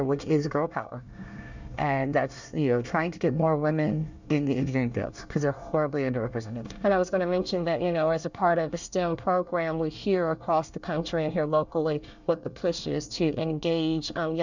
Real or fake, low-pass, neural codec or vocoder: fake; 7.2 kHz; codec, 24 kHz, 1 kbps, SNAC